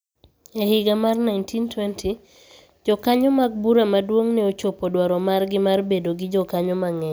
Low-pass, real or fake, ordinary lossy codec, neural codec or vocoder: none; real; none; none